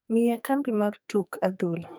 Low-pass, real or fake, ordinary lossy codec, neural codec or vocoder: none; fake; none; codec, 44.1 kHz, 2.6 kbps, SNAC